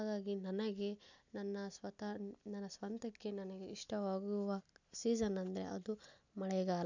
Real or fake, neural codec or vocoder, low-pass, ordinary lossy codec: real; none; 7.2 kHz; none